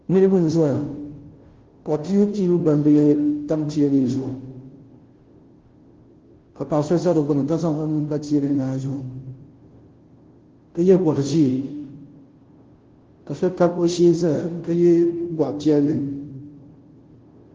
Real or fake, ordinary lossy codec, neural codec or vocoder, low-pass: fake; Opus, 16 kbps; codec, 16 kHz, 0.5 kbps, FunCodec, trained on Chinese and English, 25 frames a second; 7.2 kHz